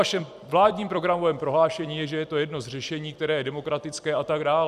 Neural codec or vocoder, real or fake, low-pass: vocoder, 44.1 kHz, 128 mel bands every 256 samples, BigVGAN v2; fake; 14.4 kHz